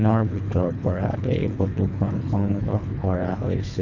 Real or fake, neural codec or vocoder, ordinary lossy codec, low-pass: fake; codec, 24 kHz, 3 kbps, HILCodec; none; 7.2 kHz